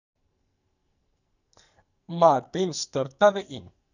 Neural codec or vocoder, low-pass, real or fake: codec, 44.1 kHz, 2.6 kbps, SNAC; 7.2 kHz; fake